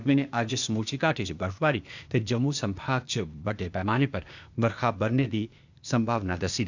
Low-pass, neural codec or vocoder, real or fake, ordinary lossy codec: 7.2 kHz; codec, 16 kHz, 0.8 kbps, ZipCodec; fake; none